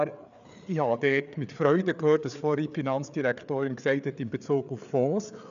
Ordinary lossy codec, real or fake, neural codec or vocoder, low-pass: none; fake; codec, 16 kHz, 4 kbps, FreqCodec, larger model; 7.2 kHz